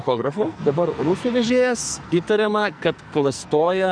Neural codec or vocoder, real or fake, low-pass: codec, 44.1 kHz, 2.6 kbps, SNAC; fake; 9.9 kHz